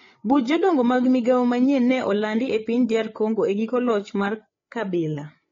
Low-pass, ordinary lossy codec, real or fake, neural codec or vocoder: 7.2 kHz; AAC, 32 kbps; fake; codec, 16 kHz, 8 kbps, FreqCodec, larger model